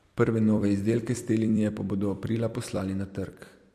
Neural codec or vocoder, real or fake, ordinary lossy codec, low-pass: vocoder, 44.1 kHz, 128 mel bands every 256 samples, BigVGAN v2; fake; MP3, 64 kbps; 14.4 kHz